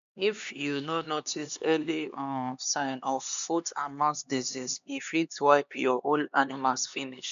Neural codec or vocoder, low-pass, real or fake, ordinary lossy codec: codec, 16 kHz, 2 kbps, X-Codec, HuBERT features, trained on LibriSpeech; 7.2 kHz; fake; MP3, 64 kbps